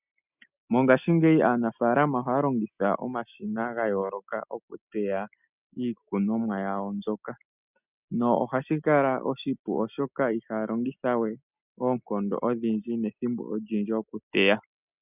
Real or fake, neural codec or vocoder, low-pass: real; none; 3.6 kHz